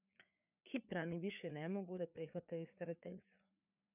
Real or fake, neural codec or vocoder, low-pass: fake; codec, 16 kHz, 4 kbps, FreqCodec, larger model; 3.6 kHz